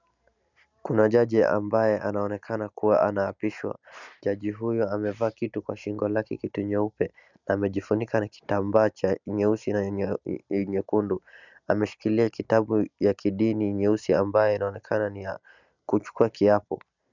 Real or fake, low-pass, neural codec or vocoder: real; 7.2 kHz; none